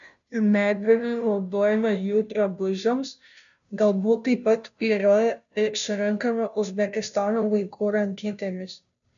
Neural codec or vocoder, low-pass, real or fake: codec, 16 kHz, 0.5 kbps, FunCodec, trained on Chinese and English, 25 frames a second; 7.2 kHz; fake